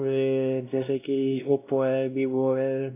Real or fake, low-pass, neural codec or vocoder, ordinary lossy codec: fake; 3.6 kHz; codec, 16 kHz, 1 kbps, X-Codec, WavLM features, trained on Multilingual LibriSpeech; AAC, 24 kbps